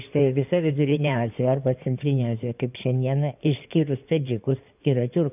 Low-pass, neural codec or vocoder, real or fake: 3.6 kHz; codec, 16 kHz in and 24 kHz out, 2.2 kbps, FireRedTTS-2 codec; fake